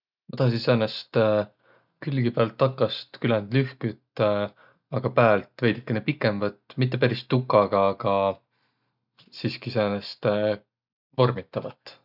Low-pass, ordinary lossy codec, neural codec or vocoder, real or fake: 5.4 kHz; none; none; real